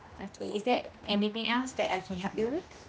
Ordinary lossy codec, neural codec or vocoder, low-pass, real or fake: none; codec, 16 kHz, 1 kbps, X-Codec, HuBERT features, trained on general audio; none; fake